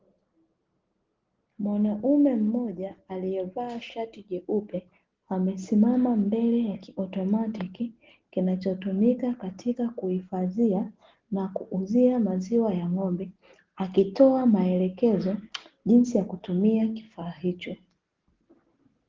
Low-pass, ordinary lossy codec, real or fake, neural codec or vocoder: 7.2 kHz; Opus, 16 kbps; real; none